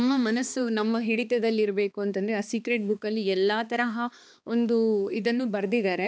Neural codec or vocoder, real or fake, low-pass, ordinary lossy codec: codec, 16 kHz, 2 kbps, X-Codec, HuBERT features, trained on balanced general audio; fake; none; none